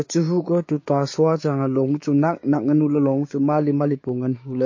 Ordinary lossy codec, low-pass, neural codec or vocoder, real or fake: MP3, 32 kbps; 7.2 kHz; codec, 16 kHz, 6 kbps, DAC; fake